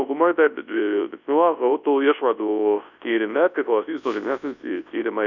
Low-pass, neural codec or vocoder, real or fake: 7.2 kHz; codec, 24 kHz, 0.9 kbps, WavTokenizer, large speech release; fake